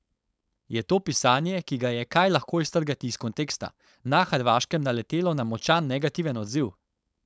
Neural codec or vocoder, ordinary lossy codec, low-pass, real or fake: codec, 16 kHz, 4.8 kbps, FACodec; none; none; fake